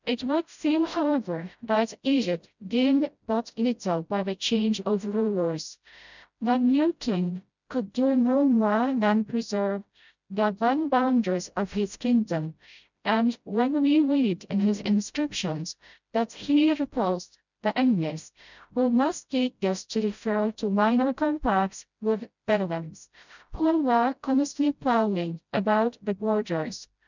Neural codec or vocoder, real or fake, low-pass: codec, 16 kHz, 0.5 kbps, FreqCodec, smaller model; fake; 7.2 kHz